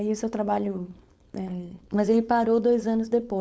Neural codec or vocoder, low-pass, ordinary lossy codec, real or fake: codec, 16 kHz, 4.8 kbps, FACodec; none; none; fake